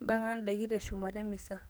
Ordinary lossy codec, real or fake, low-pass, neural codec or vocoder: none; fake; none; codec, 44.1 kHz, 2.6 kbps, SNAC